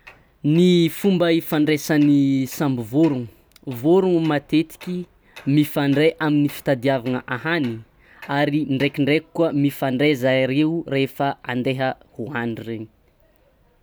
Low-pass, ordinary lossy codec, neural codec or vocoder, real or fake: none; none; none; real